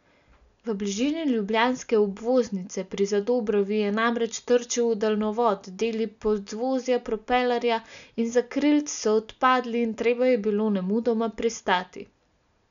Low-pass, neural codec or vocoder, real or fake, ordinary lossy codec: 7.2 kHz; none; real; none